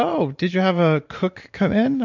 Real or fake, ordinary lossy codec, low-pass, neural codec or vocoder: fake; MP3, 64 kbps; 7.2 kHz; vocoder, 44.1 kHz, 128 mel bands every 512 samples, BigVGAN v2